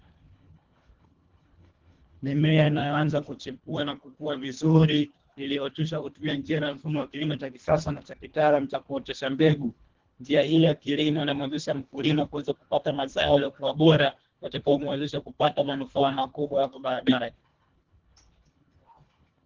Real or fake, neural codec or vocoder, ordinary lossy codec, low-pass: fake; codec, 24 kHz, 1.5 kbps, HILCodec; Opus, 16 kbps; 7.2 kHz